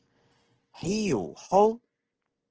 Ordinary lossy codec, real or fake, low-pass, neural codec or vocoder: Opus, 16 kbps; real; 7.2 kHz; none